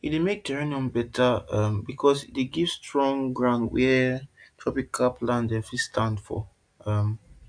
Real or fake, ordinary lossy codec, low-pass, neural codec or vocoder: real; AAC, 64 kbps; 9.9 kHz; none